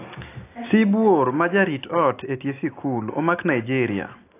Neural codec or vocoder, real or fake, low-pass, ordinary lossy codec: none; real; 3.6 kHz; AAC, 24 kbps